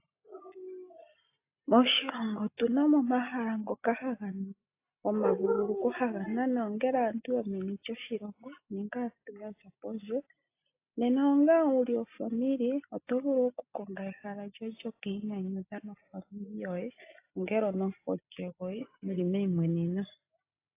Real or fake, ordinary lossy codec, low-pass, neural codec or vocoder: real; AAC, 24 kbps; 3.6 kHz; none